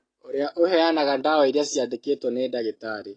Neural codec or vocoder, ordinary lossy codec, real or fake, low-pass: none; AAC, 32 kbps; real; 9.9 kHz